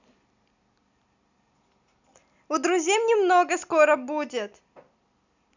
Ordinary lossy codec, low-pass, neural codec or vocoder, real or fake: none; 7.2 kHz; none; real